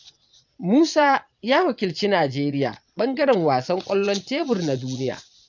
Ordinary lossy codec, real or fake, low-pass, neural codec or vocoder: none; fake; 7.2 kHz; vocoder, 22.05 kHz, 80 mel bands, Vocos